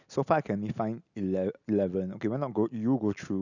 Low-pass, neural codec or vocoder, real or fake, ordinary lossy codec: 7.2 kHz; none; real; none